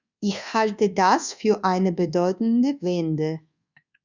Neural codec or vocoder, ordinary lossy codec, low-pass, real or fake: codec, 24 kHz, 1.2 kbps, DualCodec; Opus, 64 kbps; 7.2 kHz; fake